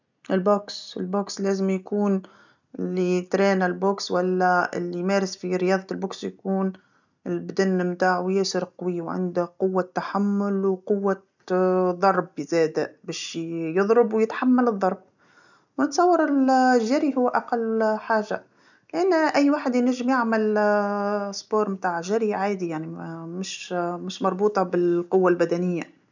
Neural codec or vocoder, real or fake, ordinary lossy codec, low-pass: none; real; none; 7.2 kHz